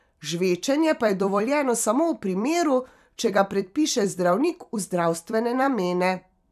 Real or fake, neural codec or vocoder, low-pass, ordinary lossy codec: fake; vocoder, 44.1 kHz, 128 mel bands every 256 samples, BigVGAN v2; 14.4 kHz; none